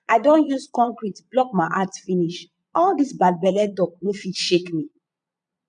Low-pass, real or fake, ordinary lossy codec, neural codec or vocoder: 9.9 kHz; fake; none; vocoder, 22.05 kHz, 80 mel bands, Vocos